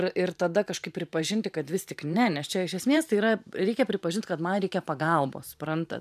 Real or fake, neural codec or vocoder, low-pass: fake; vocoder, 44.1 kHz, 128 mel bands every 512 samples, BigVGAN v2; 14.4 kHz